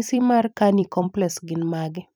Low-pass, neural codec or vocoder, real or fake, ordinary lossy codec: none; vocoder, 44.1 kHz, 128 mel bands every 256 samples, BigVGAN v2; fake; none